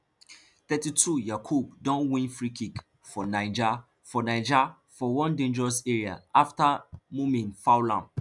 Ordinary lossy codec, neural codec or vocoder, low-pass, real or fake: none; none; 10.8 kHz; real